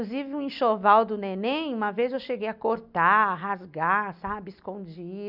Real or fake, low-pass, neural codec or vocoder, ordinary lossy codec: real; 5.4 kHz; none; none